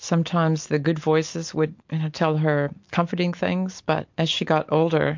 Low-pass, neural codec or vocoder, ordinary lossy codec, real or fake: 7.2 kHz; none; MP3, 48 kbps; real